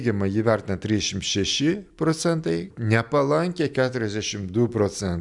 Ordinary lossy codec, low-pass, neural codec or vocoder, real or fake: MP3, 96 kbps; 10.8 kHz; none; real